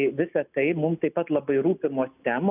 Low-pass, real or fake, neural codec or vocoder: 3.6 kHz; real; none